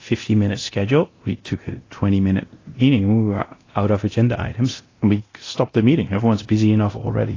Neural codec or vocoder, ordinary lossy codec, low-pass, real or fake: codec, 24 kHz, 0.9 kbps, DualCodec; AAC, 32 kbps; 7.2 kHz; fake